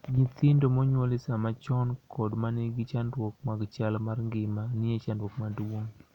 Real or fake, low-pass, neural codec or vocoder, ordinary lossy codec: real; 19.8 kHz; none; none